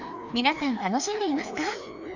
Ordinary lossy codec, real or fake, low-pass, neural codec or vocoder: none; fake; 7.2 kHz; codec, 16 kHz, 2 kbps, FreqCodec, larger model